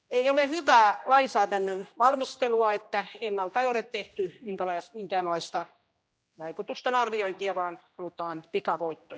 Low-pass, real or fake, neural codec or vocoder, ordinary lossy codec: none; fake; codec, 16 kHz, 1 kbps, X-Codec, HuBERT features, trained on general audio; none